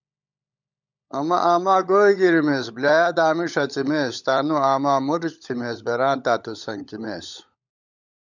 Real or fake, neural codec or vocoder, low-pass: fake; codec, 16 kHz, 16 kbps, FunCodec, trained on LibriTTS, 50 frames a second; 7.2 kHz